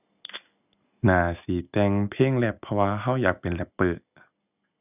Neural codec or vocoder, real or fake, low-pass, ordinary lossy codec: none; real; 3.6 kHz; none